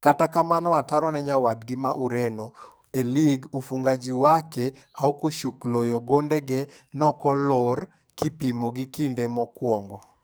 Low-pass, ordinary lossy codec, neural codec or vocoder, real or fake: none; none; codec, 44.1 kHz, 2.6 kbps, SNAC; fake